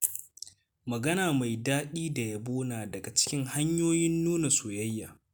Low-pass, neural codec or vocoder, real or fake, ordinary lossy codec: none; none; real; none